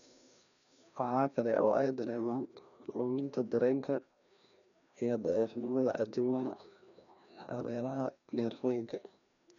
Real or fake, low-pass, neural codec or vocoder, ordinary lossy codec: fake; 7.2 kHz; codec, 16 kHz, 1 kbps, FreqCodec, larger model; none